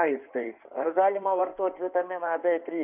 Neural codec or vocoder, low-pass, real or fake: codec, 16 kHz in and 24 kHz out, 2.2 kbps, FireRedTTS-2 codec; 3.6 kHz; fake